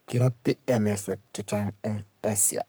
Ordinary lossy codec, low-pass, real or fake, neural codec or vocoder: none; none; fake; codec, 44.1 kHz, 3.4 kbps, Pupu-Codec